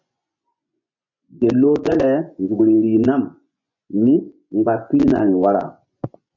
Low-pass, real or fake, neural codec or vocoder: 7.2 kHz; fake; vocoder, 24 kHz, 100 mel bands, Vocos